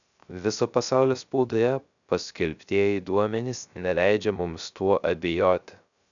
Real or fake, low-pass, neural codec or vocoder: fake; 7.2 kHz; codec, 16 kHz, 0.3 kbps, FocalCodec